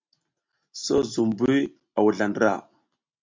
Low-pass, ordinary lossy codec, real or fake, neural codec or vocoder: 7.2 kHz; MP3, 64 kbps; real; none